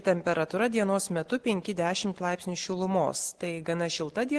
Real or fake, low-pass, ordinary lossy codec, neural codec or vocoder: real; 10.8 kHz; Opus, 16 kbps; none